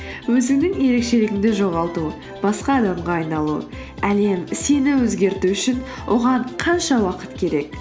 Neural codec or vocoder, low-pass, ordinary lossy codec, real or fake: none; none; none; real